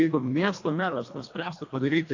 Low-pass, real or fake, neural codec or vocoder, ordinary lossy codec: 7.2 kHz; fake; codec, 24 kHz, 1.5 kbps, HILCodec; AAC, 48 kbps